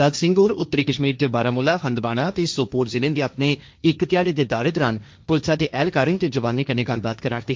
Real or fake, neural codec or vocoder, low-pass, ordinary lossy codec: fake; codec, 16 kHz, 1.1 kbps, Voila-Tokenizer; none; none